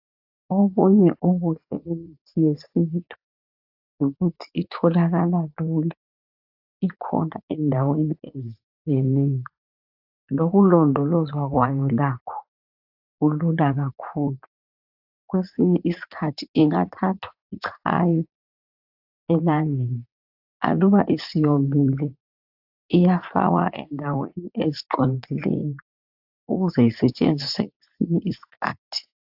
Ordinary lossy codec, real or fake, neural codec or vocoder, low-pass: AAC, 48 kbps; fake; vocoder, 44.1 kHz, 80 mel bands, Vocos; 5.4 kHz